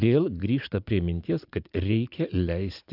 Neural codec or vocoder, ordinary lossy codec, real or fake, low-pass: vocoder, 44.1 kHz, 80 mel bands, Vocos; AAC, 48 kbps; fake; 5.4 kHz